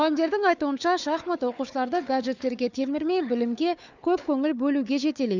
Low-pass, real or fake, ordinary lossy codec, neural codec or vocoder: 7.2 kHz; fake; none; codec, 16 kHz, 4 kbps, FunCodec, trained on Chinese and English, 50 frames a second